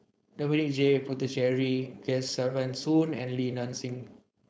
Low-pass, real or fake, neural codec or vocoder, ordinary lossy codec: none; fake; codec, 16 kHz, 4.8 kbps, FACodec; none